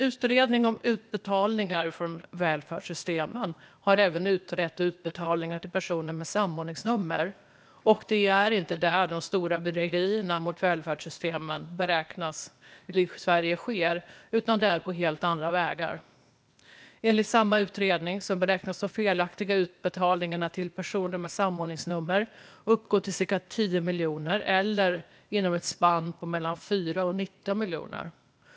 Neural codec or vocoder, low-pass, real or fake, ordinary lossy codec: codec, 16 kHz, 0.8 kbps, ZipCodec; none; fake; none